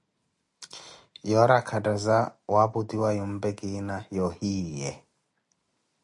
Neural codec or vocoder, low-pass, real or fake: none; 10.8 kHz; real